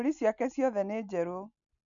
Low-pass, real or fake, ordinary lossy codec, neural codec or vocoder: 7.2 kHz; real; none; none